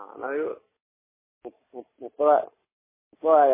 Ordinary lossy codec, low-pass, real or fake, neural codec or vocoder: MP3, 16 kbps; 3.6 kHz; real; none